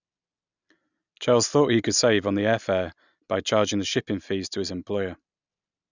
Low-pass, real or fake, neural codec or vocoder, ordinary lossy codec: 7.2 kHz; real; none; none